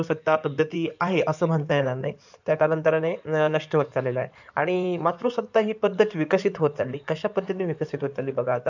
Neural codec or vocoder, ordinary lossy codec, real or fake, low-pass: codec, 16 kHz in and 24 kHz out, 2.2 kbps, FireRedTTS-2 codec; none; fake; 7.2 kHz